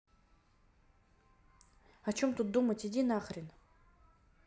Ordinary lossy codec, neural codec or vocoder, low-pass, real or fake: none; none; none; real